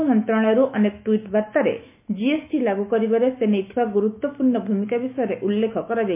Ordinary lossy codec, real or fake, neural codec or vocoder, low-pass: MP3, 24 kbps; fake; autoencoder, 48 kHz, 128 numbers a frame, DAC-VAE, trained on Japanese speech; 3.6 kHz